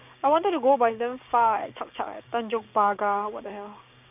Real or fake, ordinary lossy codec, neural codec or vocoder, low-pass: fake; none; codec, 16 kHz, 6 kbps, DAC; 3.6 kHz